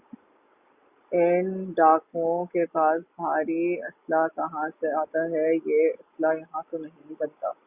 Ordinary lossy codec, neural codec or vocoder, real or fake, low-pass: Opus, 64 kbps; none; real; 3.6 kHz